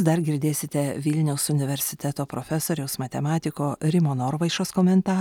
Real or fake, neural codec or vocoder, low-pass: real; none; 19.8 kHz